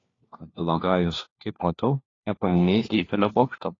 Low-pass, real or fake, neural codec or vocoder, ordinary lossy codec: 7.2 kHz; fake; codec, 16 kHz, 1 kbps, FunCodec, trained on LibriTTS, 50 frames a second; AAC, 32 kbps